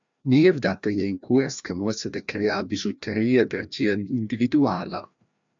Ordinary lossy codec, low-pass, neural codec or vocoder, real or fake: MP3, 64 kbps; 7.2 kHz; codec, 16 kHz, 1 kbps, FreqCodec, larger model; fake